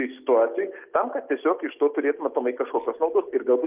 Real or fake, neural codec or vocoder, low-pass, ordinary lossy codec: real; none; 3.6 kHz; Opus, 24 kbps